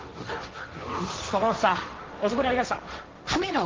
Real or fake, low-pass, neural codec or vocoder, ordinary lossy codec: fake; 7.2 kHz; codec, 16 kHz, 1.1 kbps, Voila-Tokenizer; Opus, 16 kbps